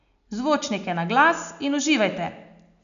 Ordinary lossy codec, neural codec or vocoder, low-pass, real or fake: none; none; 7.2 kHz; real